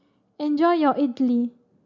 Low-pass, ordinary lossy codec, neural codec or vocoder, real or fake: 7.2 kHz; none; none; real